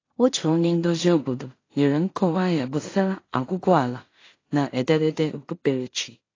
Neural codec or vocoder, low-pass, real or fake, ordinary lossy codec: codec, 16 kHz in and 24 kHz out, 0.4 kbps, LongCat-Audio-Codec, two codebook decoder; 7.2 kHz; fake; AAC, 32 kbps